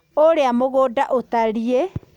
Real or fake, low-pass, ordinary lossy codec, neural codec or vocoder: real; 19.8 kHz; none; none